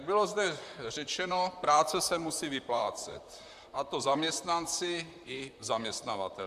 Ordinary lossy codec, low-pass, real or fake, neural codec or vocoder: MP3, 96 kbps; 14.4 kHz; fake; vocoder, 44.1 kHz, 128 mel bands, Pupu-Vocoder